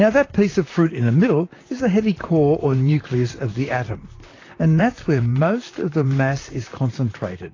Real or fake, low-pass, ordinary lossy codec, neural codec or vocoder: fake; 7.2 kHz; AAC, 32 kbps; vocoder, 44.1 kHz, 128 mel bands, Pupu-Vocoder